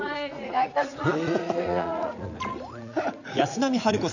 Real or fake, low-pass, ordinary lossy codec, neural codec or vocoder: fake; 7.2 kHz; none; vocoder, 44.1 kHz, 80 mel bands, Vocos